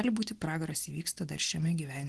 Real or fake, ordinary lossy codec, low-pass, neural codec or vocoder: real; Opus, 16 kbps; 10.8 kHz; none